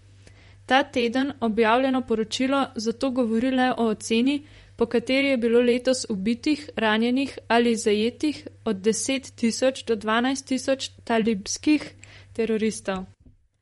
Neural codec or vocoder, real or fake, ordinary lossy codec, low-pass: vocoder, 44.1 kHz, 128 mel bands, Pupu-Vocoder; fake; MP3, 48 kbps; 19.8 kHz